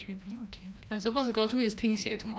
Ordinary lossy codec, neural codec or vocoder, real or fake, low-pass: none; codec, 16 kHz, 1 kbps, FreqCodec, larger model; fake; none